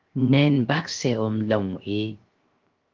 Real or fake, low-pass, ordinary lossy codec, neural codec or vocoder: fake; 7.2 kHz; Opus, 24 kbps; codec, 16 kHz, 0.7 kbps, FocalCodec